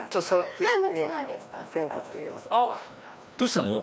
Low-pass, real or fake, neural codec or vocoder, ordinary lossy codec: none; fake; codec, 16 kHz, 1 kbps, FreqCodec, larger model; none